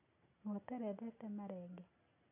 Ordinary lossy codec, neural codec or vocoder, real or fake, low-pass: none; none; real; 3.6 kHz